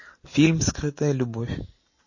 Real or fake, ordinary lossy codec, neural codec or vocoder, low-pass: real; MP3, 32 kbps; none; 7.2 kHz